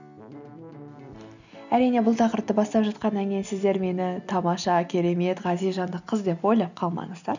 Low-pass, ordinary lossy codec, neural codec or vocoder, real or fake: 7.2 kHz; none; none; real